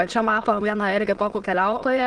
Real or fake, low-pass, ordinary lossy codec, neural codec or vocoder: fake; 9.9 kHz; Opus, 16 kbps; autoencoder, 22.05 kHz, a latent of 192 numbers a frame, VITS, trained on many speakers